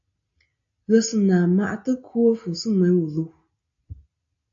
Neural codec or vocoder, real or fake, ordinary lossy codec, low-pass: none; real; AAC, 48 kbps; 7.2 kHz